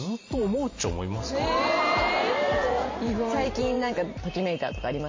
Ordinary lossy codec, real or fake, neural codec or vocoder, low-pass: MP3, 32 kbps; fake; vocoder, 44.1 kHz, 128 mel bands every 512 samples, BigVGAN v2; 7.2 kHz